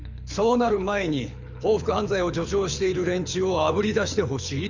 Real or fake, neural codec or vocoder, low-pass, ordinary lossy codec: fake; codec, 24 kHz, 6 kbps, HILCodec; 7.2 kHz; none